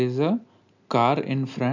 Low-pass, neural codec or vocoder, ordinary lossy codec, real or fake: 7.2 kHz; none; none; real